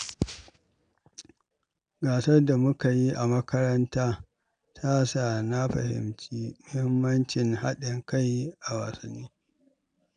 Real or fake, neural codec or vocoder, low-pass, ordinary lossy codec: real; none; 9.9 kHz; none